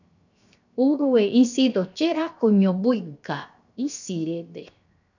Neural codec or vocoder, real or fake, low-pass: codec, 16 kHz, 0.7 kbps, FocalCodec; fake; 7.2 kHz